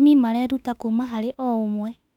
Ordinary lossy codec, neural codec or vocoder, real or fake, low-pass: none; autoencoder, 48 kHz, 32 numbers a frame, DAC-VAE, trained on Japanese speech; fake; 19.8 kHz